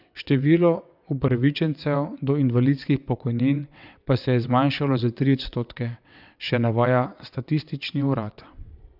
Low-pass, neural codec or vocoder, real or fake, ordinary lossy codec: 5.4 kHz; vocoder, 22.05 kHz, 80 mel bands, WaveNeXt; fake; none